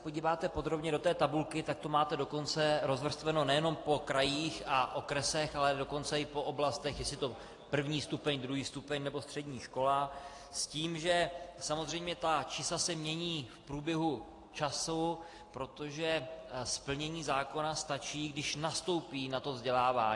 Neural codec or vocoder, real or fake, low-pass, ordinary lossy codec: none; real; 10.8 kHz; AAC, 48 kbps